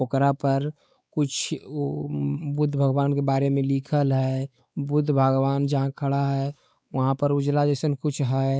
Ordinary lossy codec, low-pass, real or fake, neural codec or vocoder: none; none; fake; codec, 16 kHz, 4 kbps, X-Codec, WavLM features, trained on Multilingual LibriSpeech